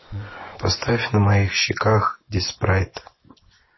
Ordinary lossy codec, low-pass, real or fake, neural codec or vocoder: MP3, 24 kbps; 7.2 kHz; fake; vocoder, 24 kHz, 100 mel bands, Vocos